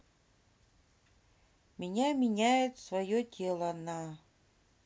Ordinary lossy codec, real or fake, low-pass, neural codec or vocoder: none; real; none; none